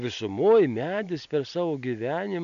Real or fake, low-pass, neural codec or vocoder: real; 7.2 kHz; none